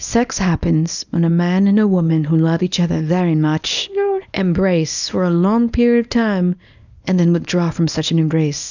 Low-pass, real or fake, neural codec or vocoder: 7.2 kHz; fake; codec, 24 kHz, 0.9 kbps, WavTokenizer, small release